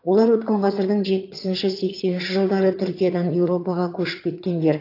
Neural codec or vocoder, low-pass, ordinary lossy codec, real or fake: vocoder, 22.05 kHz, 80 mel bands, HiFi-GAN; 5.4 kHz; AAC, 32 kbps; fake